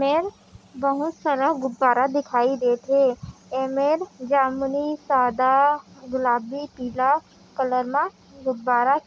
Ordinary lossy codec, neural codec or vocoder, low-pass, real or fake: none; none; none; real